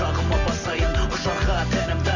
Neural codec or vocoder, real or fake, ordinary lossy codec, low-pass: none; real; none; 7.2 kHz